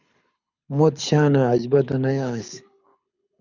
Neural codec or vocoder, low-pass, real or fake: codec, 24 kHz, 6 kbps, HILCodec; 7.2 kHz; fake